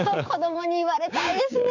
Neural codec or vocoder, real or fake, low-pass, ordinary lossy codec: codec, 16 kHz, 6 kbps, DAC; fake; 7.2 kHz; none